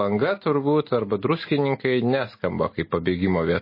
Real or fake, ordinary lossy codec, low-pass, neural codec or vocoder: real; MP3, 24 kbps; 5.4 kHz; none